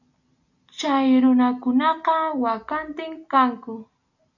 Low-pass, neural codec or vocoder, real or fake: 7.2 kHz; none; real